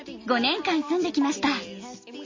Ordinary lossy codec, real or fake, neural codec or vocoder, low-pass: MP3, 32 kbps; real; none; 7.2 kHz